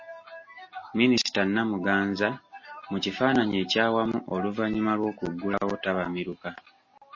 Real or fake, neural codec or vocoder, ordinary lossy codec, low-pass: real; none; MP3, 32 kbps; 7.2 kHz